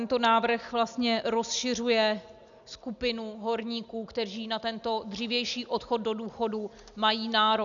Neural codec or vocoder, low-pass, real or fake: none; 7.2 kHz; real